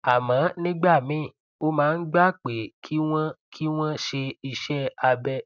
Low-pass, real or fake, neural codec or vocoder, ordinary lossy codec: 7.2 kHz; real; none; none